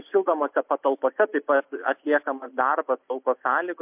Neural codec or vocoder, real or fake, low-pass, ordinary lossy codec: none; real; 3.6 kHz; MP3, 32 kbps